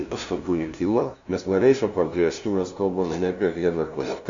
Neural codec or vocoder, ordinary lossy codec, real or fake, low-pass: codec, 16 kHz, 0.5 kbps, FunCodec, trained on LibriTTS, 25 frames a second; Opus, 64 kbps; fake; 7.2 kHz